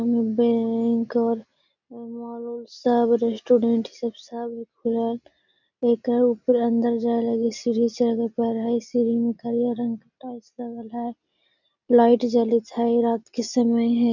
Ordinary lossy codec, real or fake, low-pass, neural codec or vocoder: none; real; 7.2 kHz; none